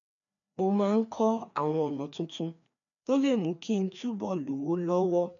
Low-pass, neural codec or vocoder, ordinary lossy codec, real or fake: 7.2 kHz; codec, 16 kHz, 2 kbps, FreqCodec, larger model; none; fake